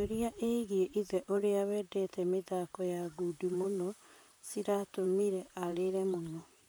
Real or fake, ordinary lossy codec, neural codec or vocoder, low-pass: fake; none; vocoder, 44.1 kHz, 128 mel bands, Pupu-Vocoder; none